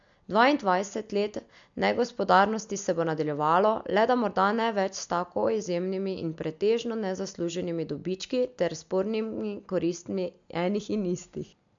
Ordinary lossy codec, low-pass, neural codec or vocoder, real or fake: MP3, 64 kbps; 7.2 kHz; none; real